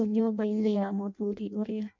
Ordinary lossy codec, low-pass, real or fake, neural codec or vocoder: MP3, 48 kbps; 7.2 kHz; fake; codec, 16 kHz in and 24 kHz out, 0.6 kbps, FireRedTTS-2 codec